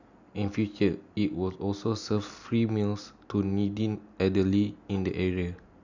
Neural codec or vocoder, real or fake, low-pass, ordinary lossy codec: none; real; 7.2 kHz; none